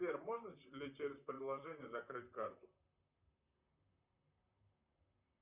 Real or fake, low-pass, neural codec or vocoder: fake; 3.6 kHz; vocoder, 44.1 kHz, 128 mel bands, Pupu-Vocoder